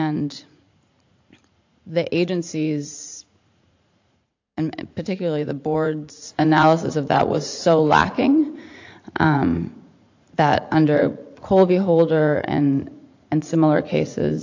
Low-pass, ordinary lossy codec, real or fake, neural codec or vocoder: 7.2 kHz; AAC, 48 kbps; fake; vocoder, 44.1 kHz, 80 mel bands, Vocos